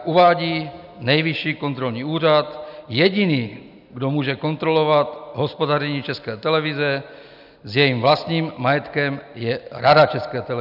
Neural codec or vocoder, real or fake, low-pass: none; real; 5.4 kHz